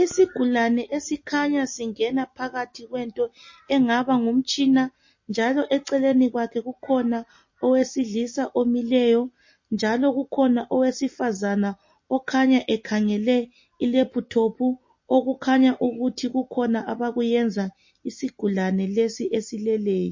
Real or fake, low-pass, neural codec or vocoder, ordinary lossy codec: real; 7.2 kHz; none; MP3, 32 kbps